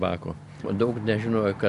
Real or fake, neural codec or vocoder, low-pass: real; none; 10.8 kHz